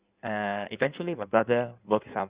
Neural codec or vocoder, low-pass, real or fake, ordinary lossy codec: codec, 16 kHz in and 24 kHz out, 1.1 kbps, FireRedTTS-2 codec; 3.6 kHz; fake; Opus, 64 kbps